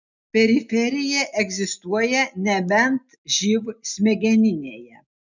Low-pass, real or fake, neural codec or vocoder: 7.2 kHz; real; none